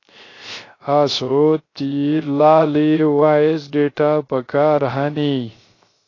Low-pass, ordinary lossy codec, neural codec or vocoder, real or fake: 7.2 kHz; AAC, 32 kbps; codec, 16 kHz, 0.3 kbps, FocalCodec; fake